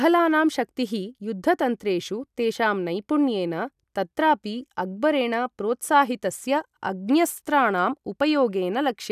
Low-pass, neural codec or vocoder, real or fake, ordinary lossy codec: 14.4 kHz; none; real; none